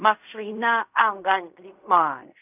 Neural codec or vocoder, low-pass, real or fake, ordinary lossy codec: codec, 16 kHz in and 24 kHz out, 0.4 kbps, LongCat-Audio-Codec, fine tuned four codebook decoder; 3.6 kHz; fake; none